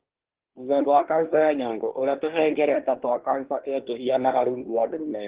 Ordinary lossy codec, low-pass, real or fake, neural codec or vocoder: Opus, 16 kbps; 3.6 kHz; fake; codec, 24 kHz, 1 kbps, SNAC